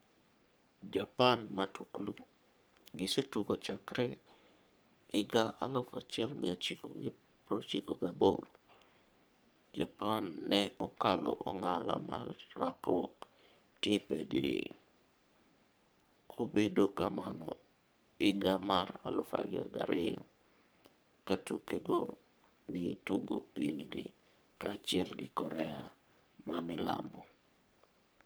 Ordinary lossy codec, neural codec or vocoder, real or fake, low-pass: none; codec, 44.1 kHz, 3.4 kbps, Pupu-Codec; fake; none